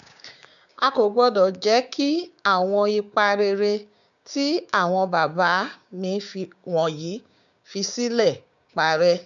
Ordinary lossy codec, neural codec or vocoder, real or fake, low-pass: none; codec, 16 kHz, 6 kbps, DAC; fake; 7.2 kHz